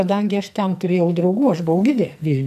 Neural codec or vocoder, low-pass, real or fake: codec, 44.1 kHz, 2.6 kbps, SNAC; 14.4 kHz; fake